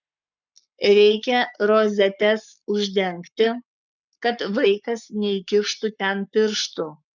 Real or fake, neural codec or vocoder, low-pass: fake; codec, 44.1 kHz, 7.8 kbps, Pupu-Codec; 7.2 kHz